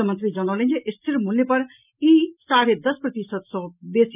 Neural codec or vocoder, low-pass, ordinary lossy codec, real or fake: none; 3.6 kHz; none; real